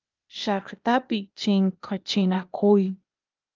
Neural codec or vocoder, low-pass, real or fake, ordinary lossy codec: codec, 16 kHz, 0.8 kbps, ZipCodec; 7.2 kHz; fake; Opus, 32 kbps